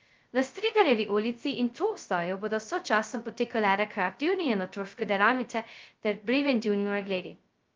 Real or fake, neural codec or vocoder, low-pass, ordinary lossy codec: fake; codec, 16 kHz, 0.2 kbps, FocalCodec; 7.2 kHz; Opus, 24 kbps